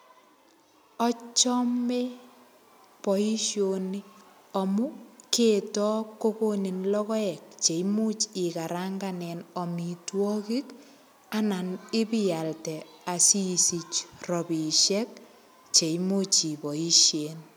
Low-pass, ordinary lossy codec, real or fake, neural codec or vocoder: none; none; real; none